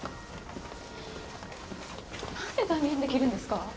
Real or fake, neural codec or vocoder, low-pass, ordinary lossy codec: real; none; none; none